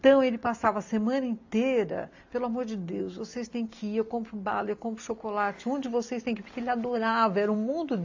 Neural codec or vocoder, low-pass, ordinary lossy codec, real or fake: none; 7.2 kHz; AAC, 48 kbps; real